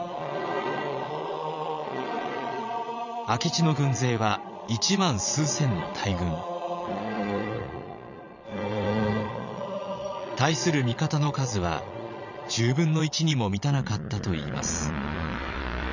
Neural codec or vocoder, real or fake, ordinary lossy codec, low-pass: vocoder, 22.05 kHz, 80 mel bands, Vocos; fake; none; 7.2 kHz